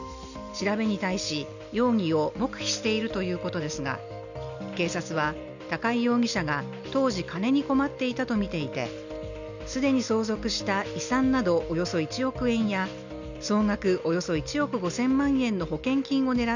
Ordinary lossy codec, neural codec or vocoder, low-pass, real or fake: none; none; 7.2 kHz; real